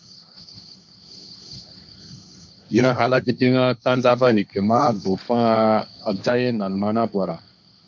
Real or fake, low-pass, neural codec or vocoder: fake; 7.2 kHz; codec, 16 kHz, 1.1 kbps, Voila-Tokenizer